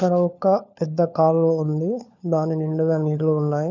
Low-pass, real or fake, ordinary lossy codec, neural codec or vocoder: 7.2 kHz; fake; none; codec, 16 kHz, 4 kbps, FunCodec, trained on LibriTTS, 50 frames a second